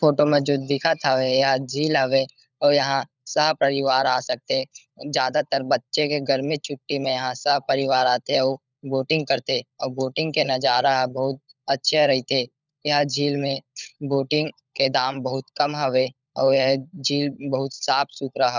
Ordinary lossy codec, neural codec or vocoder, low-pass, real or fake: none; codec, 16 kHz, 16 kbps, FunCodec, trained on LibriTTS, 50 frames a second; 7.2 kHz; fake